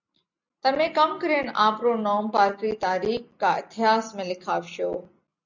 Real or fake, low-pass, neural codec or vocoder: real; 7.2 kHz; none